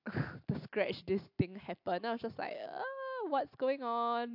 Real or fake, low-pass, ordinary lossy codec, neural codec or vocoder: real; 5.4 kHz; AAC, 48 kbps; none